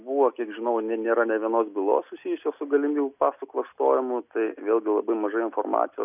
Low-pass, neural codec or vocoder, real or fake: 3.6 kHz; none; real